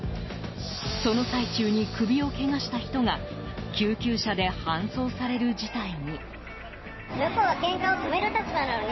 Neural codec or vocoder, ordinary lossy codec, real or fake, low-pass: none; MP3, 24 kbps; real; 7.2 kHz